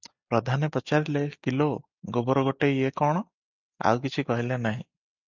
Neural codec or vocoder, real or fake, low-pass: none; real; 7.2 kHz